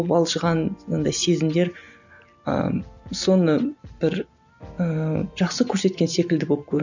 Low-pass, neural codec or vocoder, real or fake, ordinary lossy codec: 7.2 kHz; none; real; none